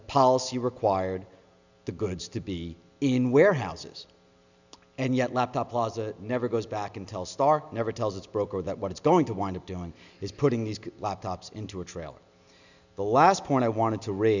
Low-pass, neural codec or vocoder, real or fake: 7.2 kHz; none; real